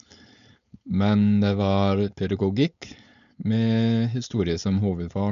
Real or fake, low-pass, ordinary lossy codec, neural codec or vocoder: fake; 7.2 kHz; none; codec, 16 kHz, 4.8 kbps, FACodec